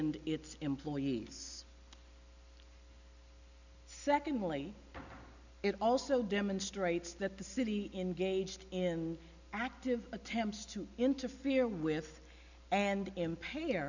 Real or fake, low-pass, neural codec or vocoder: real; 7.2 kHz; none